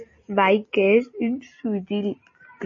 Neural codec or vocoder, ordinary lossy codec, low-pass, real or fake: none; MP3, 32 kbps; 7.2 kHz; real